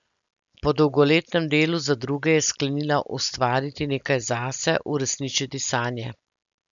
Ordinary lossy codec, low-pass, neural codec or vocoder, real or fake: none; 7.2 kHz; none; real